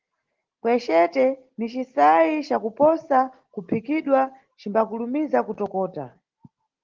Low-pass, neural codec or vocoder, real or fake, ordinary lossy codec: 7.2 kHz; none; real; Opus, 16 kbps